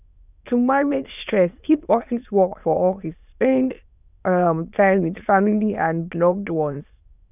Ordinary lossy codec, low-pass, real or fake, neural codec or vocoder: none; 3.6 kHz; fake; autoencoder, 22.05 kHz, a latent of 192 numbers a frame, VITS, trained on many speakers